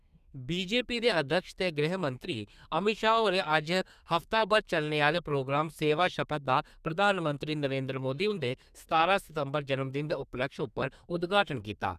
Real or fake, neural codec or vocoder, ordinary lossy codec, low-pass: fake; codec, 32 kHz, 1.9 kbps, SNAC; none; 14.4 kHz